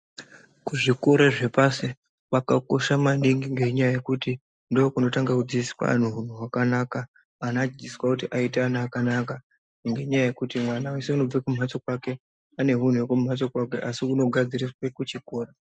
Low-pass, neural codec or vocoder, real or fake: 9.9 kHz; none; real